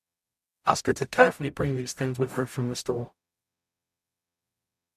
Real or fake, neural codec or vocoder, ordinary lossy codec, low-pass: fake; codec, 44.1 kHz, 0.9 kbps, DAC; MP3, 96 kbps; 14.4 kHz